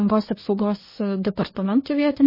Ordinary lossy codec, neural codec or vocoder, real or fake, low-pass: MP3, 24 kbps; codec, 24 kHz, 1 kbps, SNAC; fake; 5.4 kHz